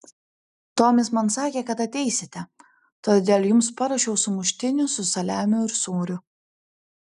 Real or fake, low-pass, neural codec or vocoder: real; 10.8 kHz; none